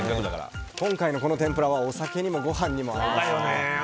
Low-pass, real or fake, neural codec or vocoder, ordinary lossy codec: none; real; none; none